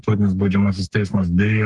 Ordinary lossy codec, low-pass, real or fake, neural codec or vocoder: Opus, 24 kbps; 10.8 kHz; fake; codec, 44.1 kHz, 3.4 kbps, Pupu-Codec